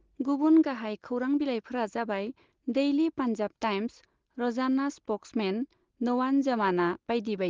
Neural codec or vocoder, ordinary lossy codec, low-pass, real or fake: none; Opus, 16 kbps; 7.2 kHz; real